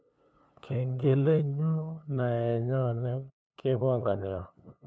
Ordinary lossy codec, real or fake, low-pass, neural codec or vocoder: none; fake; none; codec, 16 kHz, 2 kbps, FunCodec, trained on LibriTTS, 25 frames a second